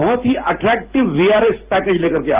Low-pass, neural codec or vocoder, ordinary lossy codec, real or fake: 3.6 kHz; none; Opus, 32 kbps; real